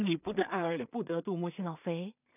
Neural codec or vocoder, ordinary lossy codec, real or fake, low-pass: codec, 16 kHz in and 24 kHz out, 0.4 kbps, LongCat-Audio-Codec, two codebook decoder; none; fake; 3.6 kHz